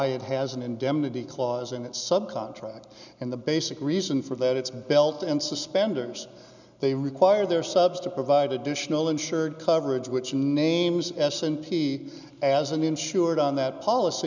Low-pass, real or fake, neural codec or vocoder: 7.2 kHz; real; none